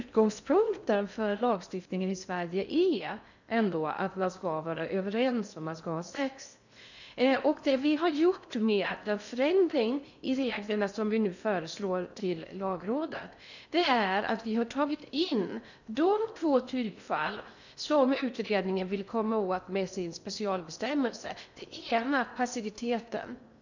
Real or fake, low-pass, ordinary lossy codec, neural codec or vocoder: fake; 7.2 kHz; none; codec, 16 kHz in and 24 kHz out, 0.6 kbps, FocalCodec, streaming, 2048 codes